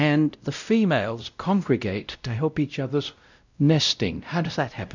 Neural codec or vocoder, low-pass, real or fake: codec, 16 kHz, 0.5 kbps, X-Codec, WavLM features, trained on Multilingual LibriSpeech; 7.2 kHz; fake